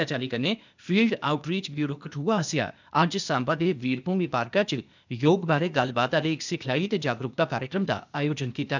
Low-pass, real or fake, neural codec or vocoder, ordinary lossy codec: 7.2 kHz; fake; codec, 16 kHz, 0.8 kbps, ZipCodec; none